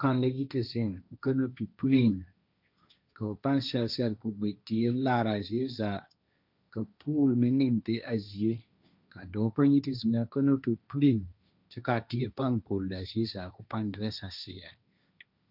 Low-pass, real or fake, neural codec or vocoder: 5.4 kHz; fake; codec, 16 kHz, 1.1 kbps, Voila-Tokenizer